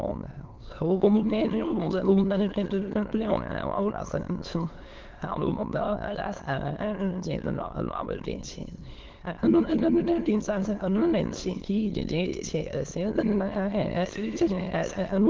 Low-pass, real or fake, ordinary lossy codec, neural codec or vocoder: 7.2 kHz; fake; Opus, 16 kbps; autoencoder, 22.05 kHz, a latent of 192 numbers a frame, VITS, trained on many speakers